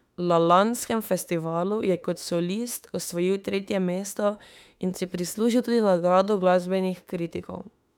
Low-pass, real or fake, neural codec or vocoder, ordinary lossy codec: 19.8 kHz; fake; autoencoder, 48 kHz, 32 numbers a frame, DAC-VAE, trained on Japanese speech; none